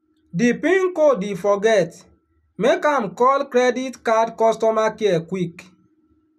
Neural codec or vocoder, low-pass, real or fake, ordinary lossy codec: none; 14.4 kHz; real; none